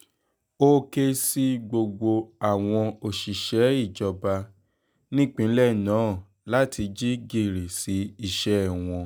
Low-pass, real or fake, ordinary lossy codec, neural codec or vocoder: none; real; none; none